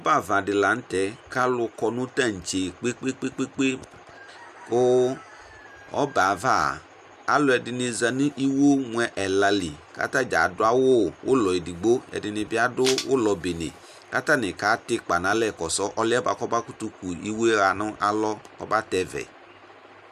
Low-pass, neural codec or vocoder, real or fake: 14.4 kHz; none; real